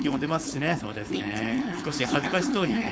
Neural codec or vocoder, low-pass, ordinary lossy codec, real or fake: codec, 16 kHz, 4.8 kbps, FACodec; none; none; fake